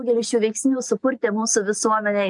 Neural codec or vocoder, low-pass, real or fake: none; 10.8 kHz; real